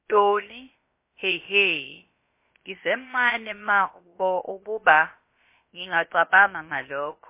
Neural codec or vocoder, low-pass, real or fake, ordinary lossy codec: codec, 16 kHz, about 1 kbps, DyCAST, with the encoder's durations; 3.6 kHz; fake; MP3, 24 kbps